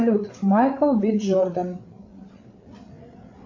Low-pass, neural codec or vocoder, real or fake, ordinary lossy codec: 7.2 kHz; codec, 16 kHz, 8 kbps, FreqCodec, larger model; fake; AAC, 48 kbps